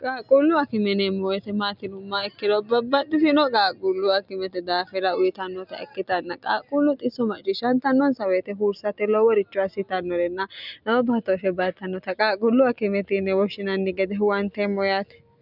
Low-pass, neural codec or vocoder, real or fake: 5.4 kHz; none; real